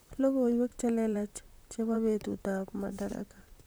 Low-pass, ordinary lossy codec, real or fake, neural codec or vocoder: none; none; fake; vocoder, 44.1 kHz, 128 mel bands, Pupu-Vocoder